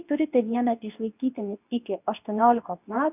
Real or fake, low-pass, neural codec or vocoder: fake; 3.6 kHz; codec, 24 kHz, 0.9 kbps, WavTokenizer, medium speech release version 2